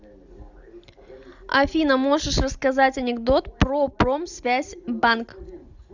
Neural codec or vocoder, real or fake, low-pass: none; real; 7.2 kHz